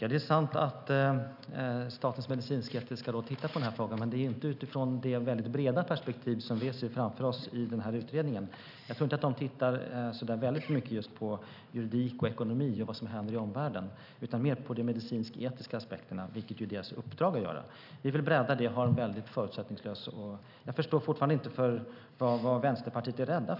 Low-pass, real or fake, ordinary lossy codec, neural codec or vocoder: 5.4 kHz; real; none; none